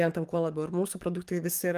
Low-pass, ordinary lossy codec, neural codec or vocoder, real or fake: 14.4 kHz; Opus, 32 kbps; codec, 44.1 kHz, 7.8 kbps, DAC; fake